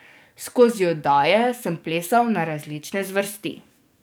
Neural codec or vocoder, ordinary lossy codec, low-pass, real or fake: codec, 44.1 kHz, 7.8 kbps, DAC; none; none; fake